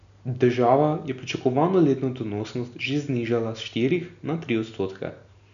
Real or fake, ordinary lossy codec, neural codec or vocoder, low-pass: real; none; none; 7.2 kHz